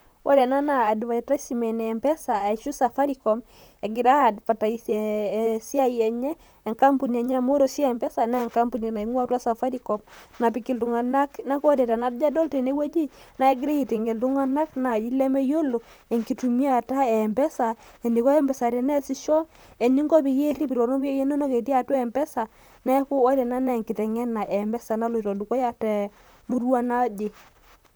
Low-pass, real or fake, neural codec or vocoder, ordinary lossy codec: none; fake; vocoder, 44.1 kHz, 128 mel bands, Pupu-Vocoder; none